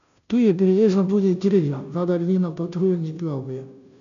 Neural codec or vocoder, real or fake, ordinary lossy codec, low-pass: codec, 16 kHz, 0.5 kbps, FunCodec, trained on Chinese and English, 25 frames a second; fake; none; 7.2 kHz